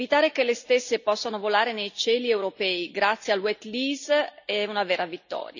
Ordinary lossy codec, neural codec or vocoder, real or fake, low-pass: none; none; real; 7.2 kHz